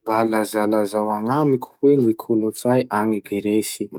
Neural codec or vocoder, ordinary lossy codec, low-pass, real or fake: codec, 44.1 kHz, 7.8 kbps, DAC; none; 19.8 kHz; fake